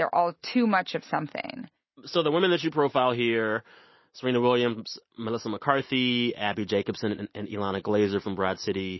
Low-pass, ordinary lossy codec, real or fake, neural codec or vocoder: 7.2 kHz; MP3, 24 kbps; real; none